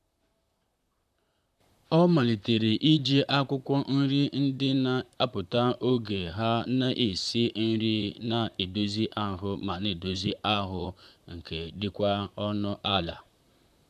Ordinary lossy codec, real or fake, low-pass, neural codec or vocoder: none; fake; 14.4 kHz; vocoder, 44.1 kHz, 128 mel bands, Pupu-Vocoder